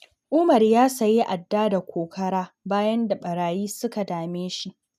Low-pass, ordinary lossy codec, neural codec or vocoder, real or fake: 14.4 kHz; none; none; real